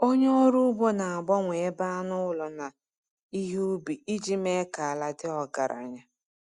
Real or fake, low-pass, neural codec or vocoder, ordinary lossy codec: real; 10.8 kHz; none; Opus, 64 kbps